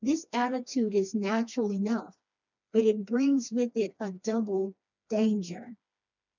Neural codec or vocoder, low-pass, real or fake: codec, 16 kHz, 2 kbps, FreqCodec, smaller model; 7.2 kHz; fake